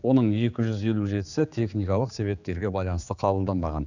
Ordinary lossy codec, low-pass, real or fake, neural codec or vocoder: none; 7.2 kHz; fake; codec, 16 kHz, 4 kbps, X-Codec, HuBERT features, trained on general audio